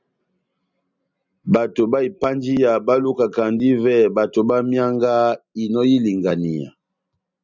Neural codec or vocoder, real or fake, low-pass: none; real; 7.2 kHz